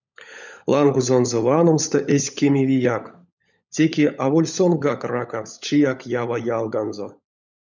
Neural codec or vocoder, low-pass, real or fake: codec, 16 kHz, 16 kbps, FunCodec, trained on LibriTTS, 50 frames a second; 7.2 kHz; fake